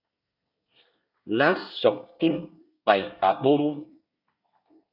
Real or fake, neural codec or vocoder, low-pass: fake; codec, 24 kHz, 1 kbps, SNAC; 5.4 kHz